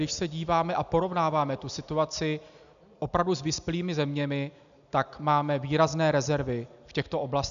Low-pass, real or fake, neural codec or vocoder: 7.2 kHz; real; none